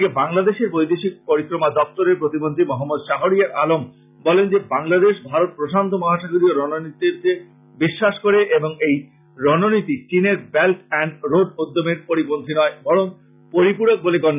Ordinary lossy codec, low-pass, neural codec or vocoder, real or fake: none; 3.6 kHz; none; real